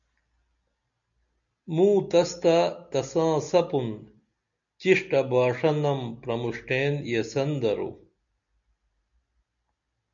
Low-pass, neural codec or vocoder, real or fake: 7.2 kHz; none; real